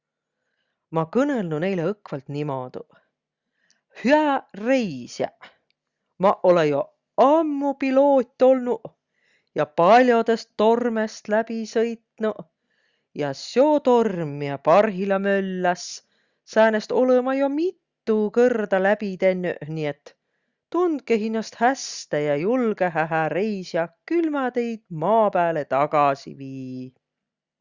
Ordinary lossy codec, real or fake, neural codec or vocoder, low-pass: Opus, 64 kbps; real; none; 7.2 kHz